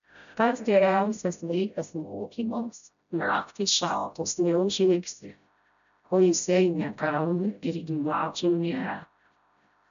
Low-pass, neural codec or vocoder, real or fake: 7.2 kHz; codec, 16 kHz, 0.5 kbps, FreqCodec, smaller model; fake